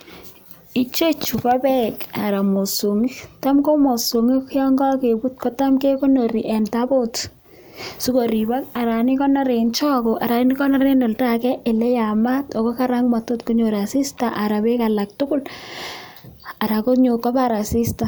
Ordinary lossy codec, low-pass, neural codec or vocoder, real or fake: none; none; none; real